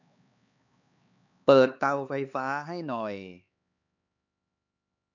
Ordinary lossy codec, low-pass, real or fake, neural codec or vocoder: none; 7.2 kHz; fake; codec, 16 kHz, 4 kbps, X-Codec, HuBERT features, trained on LibriSpeech